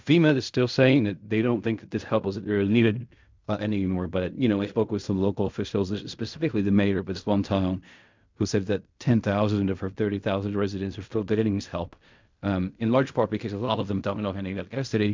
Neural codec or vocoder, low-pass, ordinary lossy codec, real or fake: codec, 16 kHz in and 24 kHz out, 0.4 kbps, LongCat-Audio-Codec, fine tuned four codebook decoder; 7.2 kHz; MP3, 64 kbps; fake